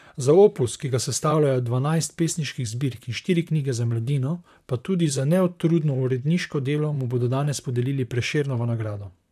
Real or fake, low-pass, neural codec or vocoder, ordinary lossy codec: fake; 14.4 kHz; vocoder, 44.1 kHz, 128 mel bands, Pupu-Vocoder; none